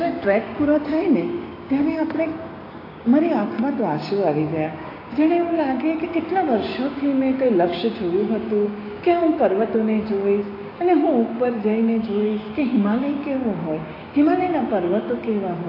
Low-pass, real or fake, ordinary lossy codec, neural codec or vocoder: 5.4 kHz; fake; AAC, 24 kbps; codec, 16 kHz, 6 kbps, DAC